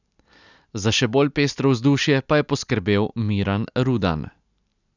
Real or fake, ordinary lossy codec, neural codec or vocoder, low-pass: real; none; none; 7.2 kHz